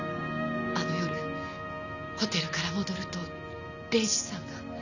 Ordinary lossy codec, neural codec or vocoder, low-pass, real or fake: none; none; 7.2 kHz; real